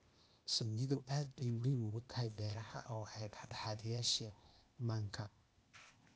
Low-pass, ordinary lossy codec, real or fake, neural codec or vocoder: none; none; fake; codec, 16 kHz, 0.8 kbps, ZipCodec